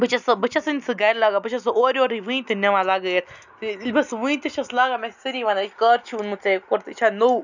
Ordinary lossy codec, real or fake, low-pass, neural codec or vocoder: none; real; 7.2 kHz; none